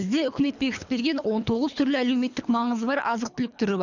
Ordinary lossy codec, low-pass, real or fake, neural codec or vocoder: none; 7.2 kHz; fake; codec, 24 kHz, 3 kbps, HILCodec